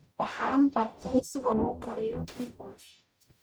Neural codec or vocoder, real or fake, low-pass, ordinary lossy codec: codec, 44.1 kHz, 0.9 kbps, DAC; fake; none; none